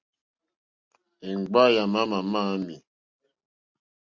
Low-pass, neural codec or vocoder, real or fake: 7.2 kHz; none; real